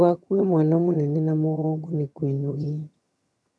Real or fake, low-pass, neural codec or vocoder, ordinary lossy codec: fake; none; vocoder, 22.05 kHz, 80 mel bands, HiFi-GAN; none